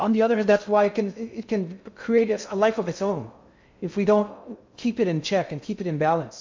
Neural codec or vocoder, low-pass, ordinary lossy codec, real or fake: codec, 16 kHz in and 24 kHz out, 0.6 kbps, FocalCodec, streaming, 2048 codes; 7.2 kHz; MP3, 64 kbps; fake